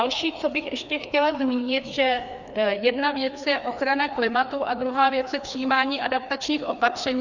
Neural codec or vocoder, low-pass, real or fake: codec, 16 kHz, 2 kbps, FreqCodec, larger model; 7.2 kHz; fake